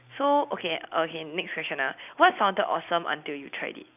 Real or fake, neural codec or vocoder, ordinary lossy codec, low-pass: real; none; none; 3.6 kHz